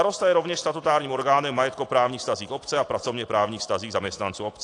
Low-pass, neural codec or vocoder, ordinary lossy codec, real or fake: 10.8 kHz; none; AAC, 48 kbps; real